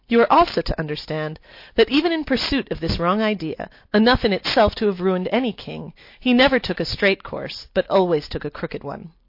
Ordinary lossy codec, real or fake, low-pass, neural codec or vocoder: MP3, 32 kbps; real; 5.4 kHz; none